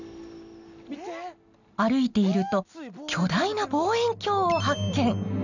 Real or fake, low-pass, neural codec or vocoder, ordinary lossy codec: real; 7.2 kHz; none; none